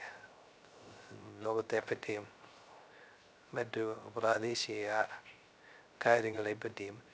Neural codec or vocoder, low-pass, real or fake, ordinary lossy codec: codec, 16 kHz, 0.3 kbps, FocalCodec; none; fake; none